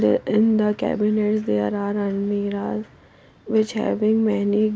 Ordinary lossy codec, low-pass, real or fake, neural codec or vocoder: none; none; real; none